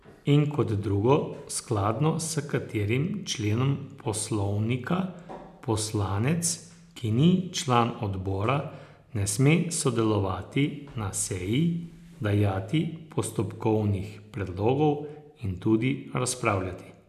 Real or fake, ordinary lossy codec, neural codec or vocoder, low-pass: real; none; none; 14.4 kHz